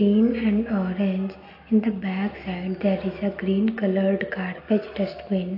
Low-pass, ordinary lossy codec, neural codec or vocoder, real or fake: 5.4 kHz; AAC, 32 kbps; none; real